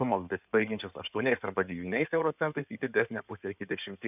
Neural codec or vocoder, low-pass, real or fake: codec, 16 kHz in and 24 kHz out, 2.2 kbps, FireRedTTS-2 codec; 3.6 kHz; fake